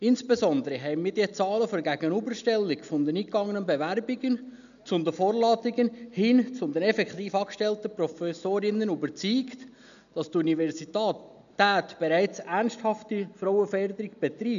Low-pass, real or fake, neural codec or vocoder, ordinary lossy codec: 7.2 kHz; real; none; none